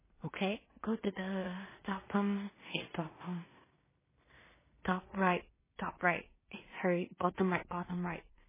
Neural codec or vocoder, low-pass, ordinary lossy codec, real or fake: codec, 16 kHz in and 24 kHz out, 0.4 kbps, LongCat-Audio-Codec, two codebook decoder; 3.6 kHz; MP3, 16 kbps; fake